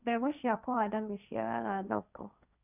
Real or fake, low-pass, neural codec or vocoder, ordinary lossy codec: fake; 3.6 kHz; codec, 16 kHz, 1.1 kbps, Voila-Tokenizer; none